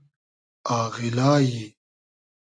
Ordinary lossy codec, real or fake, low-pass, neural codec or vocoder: AAC, 48 kbps; real; 9.9 kHz; none